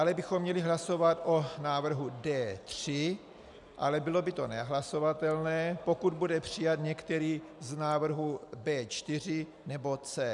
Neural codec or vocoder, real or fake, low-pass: none; real; 10.8 kHz